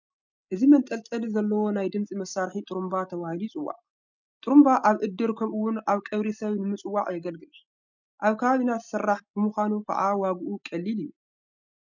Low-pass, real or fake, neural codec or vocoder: 7.2 kHz; real; none